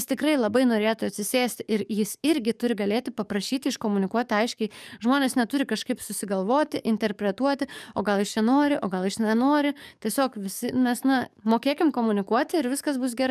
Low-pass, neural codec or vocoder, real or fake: 14.4 kHz; codec, 44.1 kHz, 7.8 kbps, DAC; fake